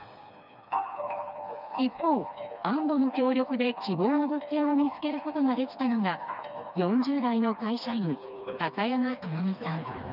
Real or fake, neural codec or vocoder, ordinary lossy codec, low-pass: fake; codec, 16 kHz, 2 kbps, FreqCodec, smaller model; none; 5.4 kHz